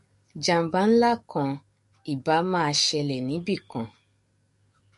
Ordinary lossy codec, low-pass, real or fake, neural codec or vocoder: MP3, 48 kbps; 14.4 kHz; fake; codec, 44.1 kHz, 7.8 kbps, DAC